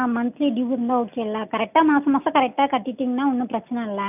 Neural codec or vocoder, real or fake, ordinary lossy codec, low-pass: none; real; none; 3.6 kHz